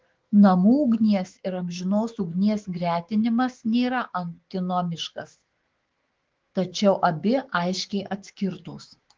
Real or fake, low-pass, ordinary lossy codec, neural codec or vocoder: fake; 7.2 kHz; Opus, 16 kbps; autoencoder, 48 kHz, 128 numbers a frame, DAC-VAE, trained on Japanese speech